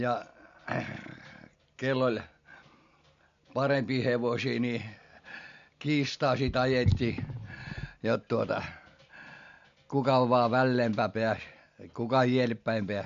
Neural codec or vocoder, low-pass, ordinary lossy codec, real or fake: none; 7.2 kHz; MP3, 48 kbps; real